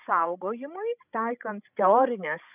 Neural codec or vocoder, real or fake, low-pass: codec, 16 kHz, 16 kbps, FreqCodec, larger model; fake; 3.6 kHz